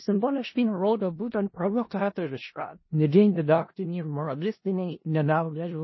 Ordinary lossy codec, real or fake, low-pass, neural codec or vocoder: MP3, 24 kbps; fake; 7.2 kHz; codec, 16 kHz in and 24 kHz out, 0.4 kbps, LongCat-Audio-Codec, four codebook decoder